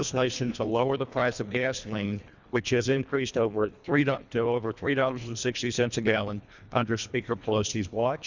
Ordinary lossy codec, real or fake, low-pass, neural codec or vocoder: Opus, 64 kbps; fake; 7.2 kHz; codec, 24 kHz, 1.5 kbps, HILCodec